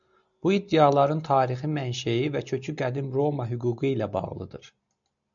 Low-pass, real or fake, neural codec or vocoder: 7.2 kHz; real; none